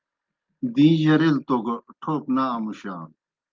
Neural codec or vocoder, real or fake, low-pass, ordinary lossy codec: none; real; 7.2 kHz; Opus, 32 kbps